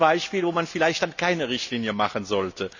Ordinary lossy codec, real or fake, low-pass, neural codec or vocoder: none; real; 7.2 kHz; none